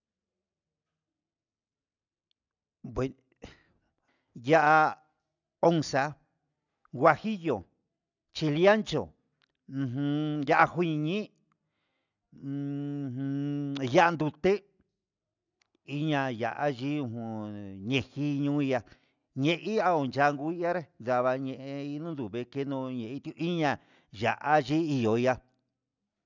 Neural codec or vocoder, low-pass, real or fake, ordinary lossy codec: none; 7.2 kHz; real; none